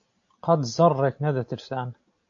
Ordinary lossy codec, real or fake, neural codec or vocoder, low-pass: AAC, 48 kbps; real; none; 7.2 kHz